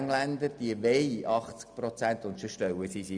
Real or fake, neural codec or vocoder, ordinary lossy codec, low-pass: real; none; none; 9.9 kHz